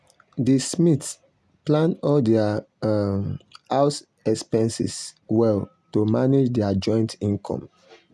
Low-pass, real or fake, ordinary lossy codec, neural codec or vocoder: none; real; none; none